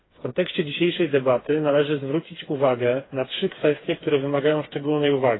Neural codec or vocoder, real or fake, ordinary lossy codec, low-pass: codec, 16 kHz, 4 kbps, FreqCodec, smaller model; fake; AAC, 16 kbps; 7.2 kHz